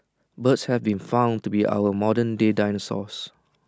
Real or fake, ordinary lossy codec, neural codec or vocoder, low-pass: real; none; none; none